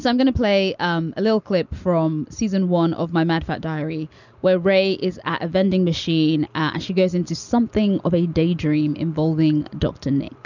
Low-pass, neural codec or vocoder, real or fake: 7.2 kHz; none; real